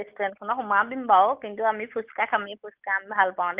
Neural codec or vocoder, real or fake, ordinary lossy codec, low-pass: none; real; Opus, 24 kbps; 3.6 kHz